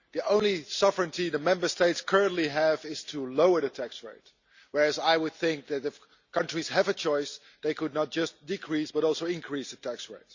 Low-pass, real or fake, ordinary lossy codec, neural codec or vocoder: 7.2 kHz; real; Opus, 64 kbps; none